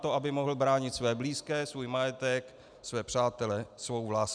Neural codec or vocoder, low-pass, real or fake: none; 9.9 kHz; real